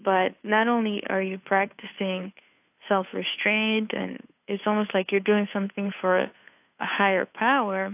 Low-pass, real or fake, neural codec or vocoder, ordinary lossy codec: 3.6 kHz; fake; codec, 16 kHz, 2 kbps, FunCodec, trained on Chinese and English, 25 frames a second; AAC, 32 kbps